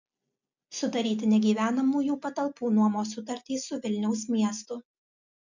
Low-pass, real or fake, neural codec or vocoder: 7.2 kHz; real; none